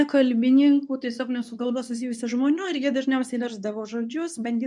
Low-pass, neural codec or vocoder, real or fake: 10.8 kHz; codec, 24 kHz, 0.9 kbps, WavTokenizer, medium speech release version 2; fake